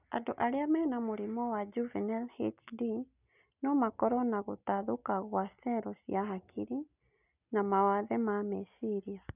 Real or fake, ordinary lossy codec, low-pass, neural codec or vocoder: real; none; 3.6 kHz; none